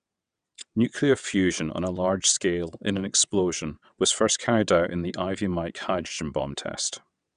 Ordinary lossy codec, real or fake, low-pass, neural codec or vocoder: none; fake; 9.9 kHz; vocoder, 22.05 kHz, 80 mel bands, WaveNeXt